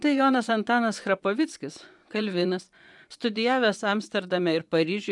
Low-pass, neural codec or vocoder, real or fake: 10.8 kHz; vocoder, 24 kHz, 100 mel bands, Vocos; fake